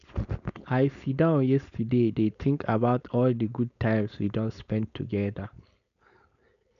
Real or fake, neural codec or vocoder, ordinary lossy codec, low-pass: fake; codec, 16 kHz, 4.8 kbps, FACodec; none; 7.2 kHz